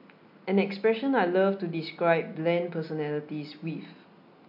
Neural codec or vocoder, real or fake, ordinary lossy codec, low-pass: none; real; MP3, 48 kbps; 5.4 kHz